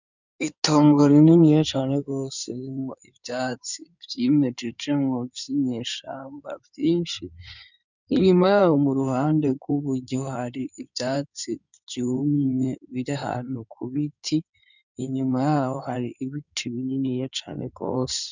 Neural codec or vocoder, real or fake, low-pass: codec, 16 kHz in and 24 kHz out, 2.2 kbps, FireRedTTS-2 codec; fake; 7.2 kHz